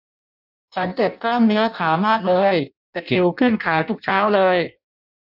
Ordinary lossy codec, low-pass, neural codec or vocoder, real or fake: none; 5.4 kHz; codec, 16 kHz in and 24 kHz out, 0.6 kbps, FireRedTTS-2 codec; fake